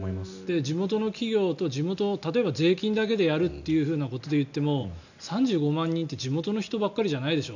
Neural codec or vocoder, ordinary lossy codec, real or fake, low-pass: none; none; real; 7.2 kHz